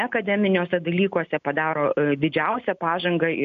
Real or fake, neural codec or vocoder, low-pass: real; none; 7.2 kHz